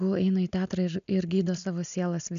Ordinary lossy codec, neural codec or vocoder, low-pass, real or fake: MP3, 64 kbps; none; 7.2 kHz; real